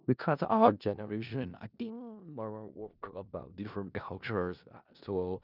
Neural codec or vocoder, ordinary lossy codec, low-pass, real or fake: codec, 16 kHz in and 24 kHz out, 0.4 kbps, LongCat-Audio-Codec, four codebook decoder; none; 5.4 kHz; fake